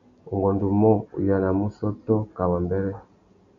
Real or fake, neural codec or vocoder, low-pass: real; none; 7.2 kHz